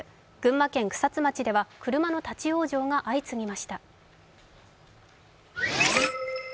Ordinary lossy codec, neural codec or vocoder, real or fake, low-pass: none; none; real; none